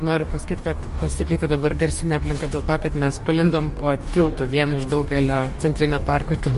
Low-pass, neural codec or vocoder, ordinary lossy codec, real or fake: 14.4 kHz; codec, 44.1 kHz, 2.6 kbps, DAC; MP3, 48 kbps; fake